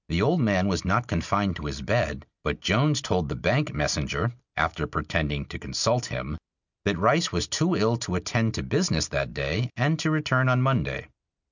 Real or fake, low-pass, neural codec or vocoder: real; 7.2 kHz; none